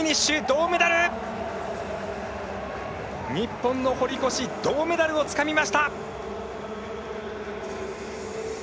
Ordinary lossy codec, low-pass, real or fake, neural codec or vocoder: none; none; real; none